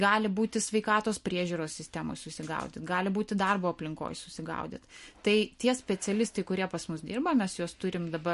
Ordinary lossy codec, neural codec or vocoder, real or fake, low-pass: MP3, 48 kbps; none; real; 14.4 kHz